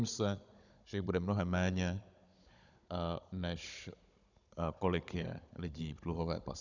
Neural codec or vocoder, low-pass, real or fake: codec, 16 kHz, 16 kbps, FunCodec, trained on Chinese and English, 50 frames a second; 7.2 kHz; fake